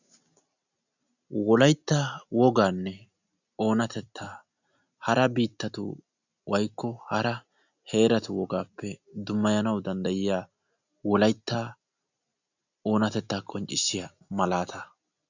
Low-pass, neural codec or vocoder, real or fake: 7.2 kHz; none; real